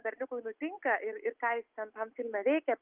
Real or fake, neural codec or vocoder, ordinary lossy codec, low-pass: real; none; Opus, 24 kbps; 3.6 kHz